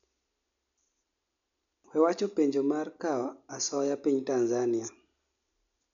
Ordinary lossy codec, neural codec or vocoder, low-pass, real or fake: none; none; 7.2 kHz; real